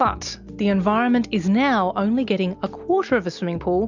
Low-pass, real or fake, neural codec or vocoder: 7.2 kHz; real; none